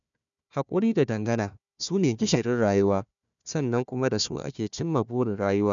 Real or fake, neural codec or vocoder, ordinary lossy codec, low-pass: fake; codec, 16 kHz, 1 kbps, FunCodec, trained on Chinese and English, 50 frames a second; none; 7.2 kHz